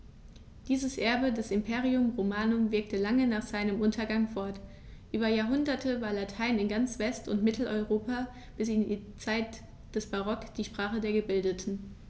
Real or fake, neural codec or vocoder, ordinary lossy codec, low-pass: real; none; none; none